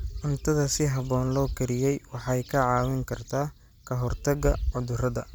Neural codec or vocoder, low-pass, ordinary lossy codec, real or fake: none; none; none; real